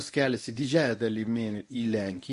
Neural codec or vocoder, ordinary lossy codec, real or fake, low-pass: codec, 24 kHz, 0.9 kbps, WavTokenizer, medium speech release version 1; MP3, 48 kbps; fake; 10.8 kHz